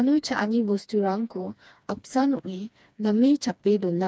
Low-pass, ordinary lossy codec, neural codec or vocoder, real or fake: none; none; codec, 16 kHz, 2 kbps, FreqCodec, smaller model; fake